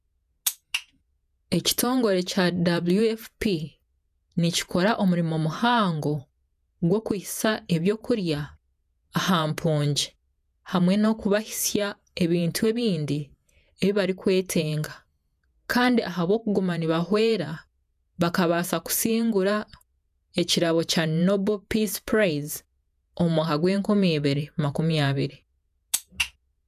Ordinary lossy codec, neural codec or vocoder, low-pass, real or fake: none; vocoder, 48 kHz, 128 mel bands, Vocos; 14.4 kHz; fake